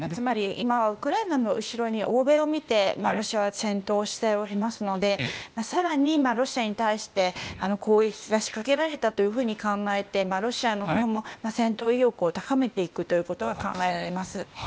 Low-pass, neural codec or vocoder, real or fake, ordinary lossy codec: none; codec, 16 kHz, 0.8 kbps, ZipCodec; fake; none